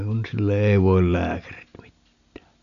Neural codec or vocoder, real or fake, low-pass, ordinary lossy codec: none; real; 7.2 kHz; none